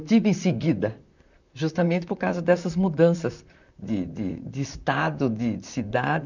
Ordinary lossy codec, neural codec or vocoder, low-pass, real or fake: none; vocoder, 44.1 kHz, 128 mel bands, Pupu-Vocoder; 7.2 kHz; fake